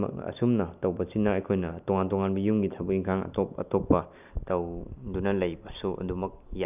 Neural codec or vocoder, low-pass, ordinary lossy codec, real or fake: none; 3.6 kHz; none; real